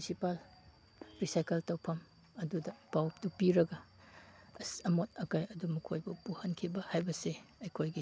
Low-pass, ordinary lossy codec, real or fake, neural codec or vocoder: none; none; real; none